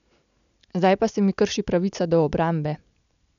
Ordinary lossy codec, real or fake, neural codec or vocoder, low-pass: none; real; none; 7.2 kHz